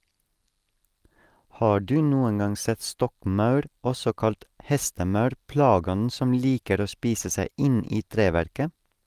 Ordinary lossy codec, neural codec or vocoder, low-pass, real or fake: Opus, 24 kbps; none; 14.4 kHz; real